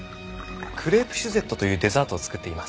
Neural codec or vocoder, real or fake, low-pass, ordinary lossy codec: none; real; none; none